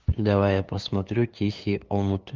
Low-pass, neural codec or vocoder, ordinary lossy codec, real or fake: 7.2 kHz; codec, 16 kHz, 2 kbps, FunCodec, trained on LibriTTS, 25 frames a second; Opus, 32 kbps; fake